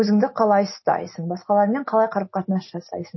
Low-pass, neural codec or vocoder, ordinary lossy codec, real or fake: 7.2 kHz; none; MP3, 24 kbps; real